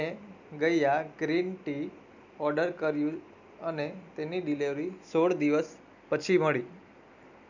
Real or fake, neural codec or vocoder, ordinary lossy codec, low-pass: real; none; none; 7.2 kHz